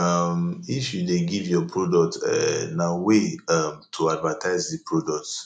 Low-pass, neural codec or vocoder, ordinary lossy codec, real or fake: 9.9 kHz; none; none; real